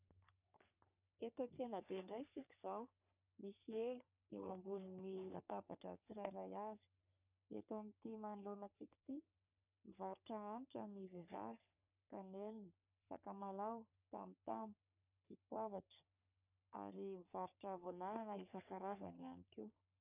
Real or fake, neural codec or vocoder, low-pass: fake; codec, 44.1 kHz, 3.4 kbps, Pupu-Codec; 3.6 kHz